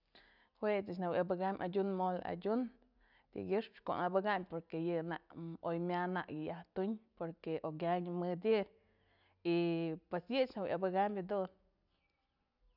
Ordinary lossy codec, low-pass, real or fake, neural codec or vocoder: none; 5.4 kHz; real; none